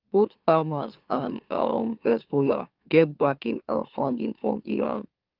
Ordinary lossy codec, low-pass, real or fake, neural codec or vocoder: Opus, 32 kbps; 5.4 kHz; fake; autoencoder, 44.1 kHz, a latent of 192 numbers a frame, MeloTTS